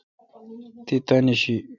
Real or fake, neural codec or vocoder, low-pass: real; none; 7.2 kHz